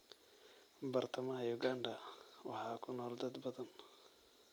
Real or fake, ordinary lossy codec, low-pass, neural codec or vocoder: real; none; none; none